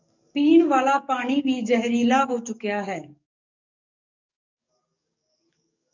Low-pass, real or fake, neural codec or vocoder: 7.2 kHz; fake; codec, 44.1 kHz, 7.8 kbps, DAC